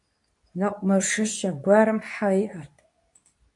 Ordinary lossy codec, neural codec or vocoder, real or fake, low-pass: AAC, 64 kbps; codec, 24 kHz, 0.9 kbps, WavTokenizer, medium speech release version 2; fake; 10.8 kHz